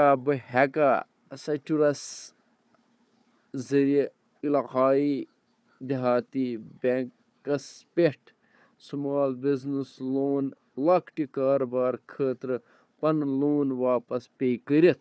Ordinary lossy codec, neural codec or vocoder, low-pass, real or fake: none; codec, 16 kHz, 4 kbps, FunCodec, trained on Chinese and English, 50 frames a second; none; fake